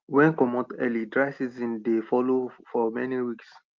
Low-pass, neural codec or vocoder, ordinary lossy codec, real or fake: 7.2 kHz; none; Opus, 32 kbps; real